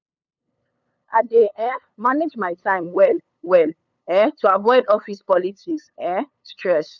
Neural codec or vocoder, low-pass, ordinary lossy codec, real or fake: codec, 16 kHz, 8 kbps, FunCodec, trained on LibriTTS, 25 frames a second; 7.2 kHz; none; fake